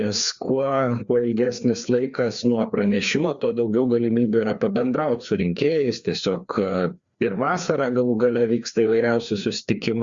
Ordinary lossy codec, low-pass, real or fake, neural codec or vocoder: Opus, 64 kbps; 7.2 kHz; fake; codec, 16 kHz, 2 kbps, FreqCodec, larger model